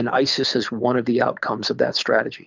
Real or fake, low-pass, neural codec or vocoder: fake; 7.2 kHz; codec, 24 kHz, 6 kbps, HILCodec